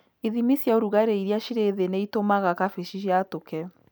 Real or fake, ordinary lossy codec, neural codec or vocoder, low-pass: real; none; none; none